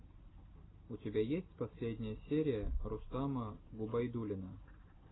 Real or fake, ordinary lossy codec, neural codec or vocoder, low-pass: real; AAC, 16 kbps; none; 7.2 kHz